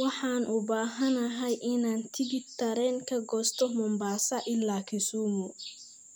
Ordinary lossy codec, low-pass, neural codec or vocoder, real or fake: none; none; none; real